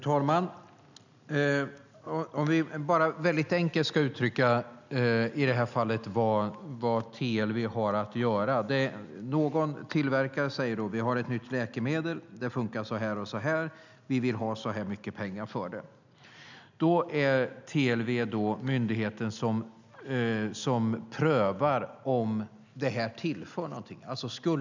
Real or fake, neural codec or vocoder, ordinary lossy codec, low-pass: real; none; none; 7.2 kHz